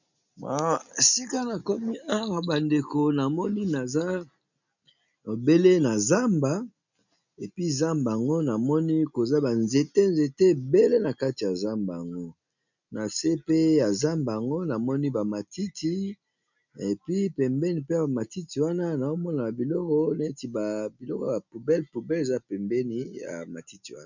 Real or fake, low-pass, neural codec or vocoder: real; 7.2 kHz; none